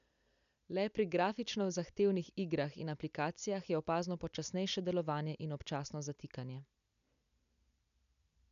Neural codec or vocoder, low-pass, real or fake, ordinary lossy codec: none; 7.2 kHz; real; none